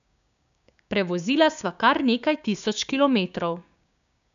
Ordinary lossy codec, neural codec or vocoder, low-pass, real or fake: none; codec, 16 kHz, 6 kbps, DAC; 7.2 kHz; fake